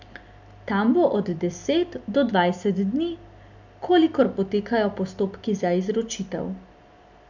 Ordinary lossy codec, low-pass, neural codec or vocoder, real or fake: none; 7.2 kHz; none; real